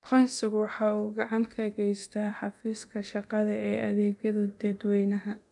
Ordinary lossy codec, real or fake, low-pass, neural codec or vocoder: none; fake; 10.8 kHz; codec, 24 kHz, 0.9 kbps, DualCodec